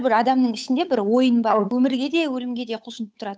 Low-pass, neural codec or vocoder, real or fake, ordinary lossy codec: none; codec, 16 kHz, 8 kbps, FunCodec, trained on Chinese and English, 25 frames a second; fake; none